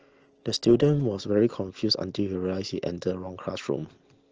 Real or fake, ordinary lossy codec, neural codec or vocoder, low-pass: real; Opus, 16 kbps; none; 7.2 kHz